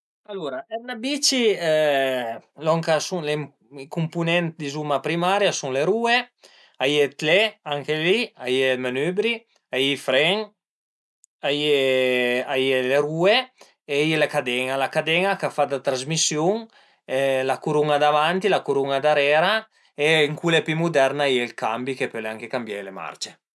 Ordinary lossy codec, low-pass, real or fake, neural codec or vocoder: none; none; real; none